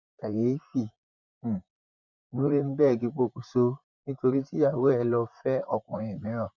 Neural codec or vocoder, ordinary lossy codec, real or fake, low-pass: vocoder, 44.1 kHz, 128 mel bands, Pupu-Vocoder; none; fake; 7.2 kHz